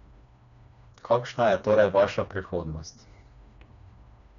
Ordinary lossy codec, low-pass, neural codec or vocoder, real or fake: none; 7.2 kHz; codec, 16 kHz, 2 kbps, FreqCodec, smaller model; fake